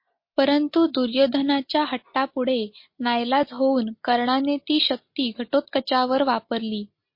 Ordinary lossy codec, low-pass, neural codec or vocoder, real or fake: MP3, 32 kbps; 5.4 kHz; none; real